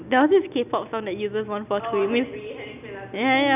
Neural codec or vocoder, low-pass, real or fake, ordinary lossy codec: none; 3.6 kHz; real; none